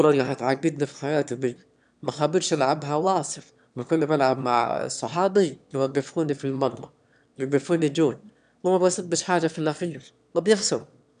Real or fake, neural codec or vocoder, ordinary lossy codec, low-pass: fake; autoencoder, 22.05 kHz, a latent of 192 numbers a frame, VITS, trained on one speaker; none; 9.9 kHz